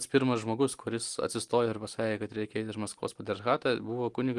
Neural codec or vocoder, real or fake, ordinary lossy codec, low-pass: none; real; Opus, 24 kbps; 10.8 kHz